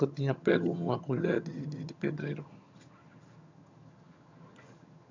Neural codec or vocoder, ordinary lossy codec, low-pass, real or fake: vocoder, 22.05 kHz, 80 mel bands, HiFi-GAN; MP3, 64 kbps; 7.2 kHz; fake